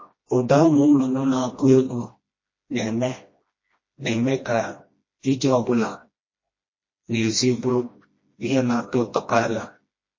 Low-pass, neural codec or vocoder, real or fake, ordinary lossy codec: 7.2 kHz; codec, 16 kHz, 1 kbps, FreqCodec, smaller model; fake; MP3, 32 kbps